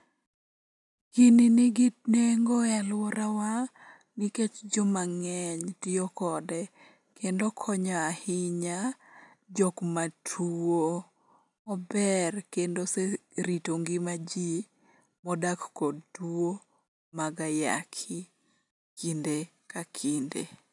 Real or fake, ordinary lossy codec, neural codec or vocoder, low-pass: real; none; none; 10.8 kHz